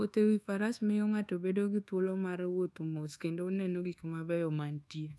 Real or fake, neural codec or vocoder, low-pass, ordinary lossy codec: fake; codec, 24 kHz, 1.2 kbps, DualCodec; none; none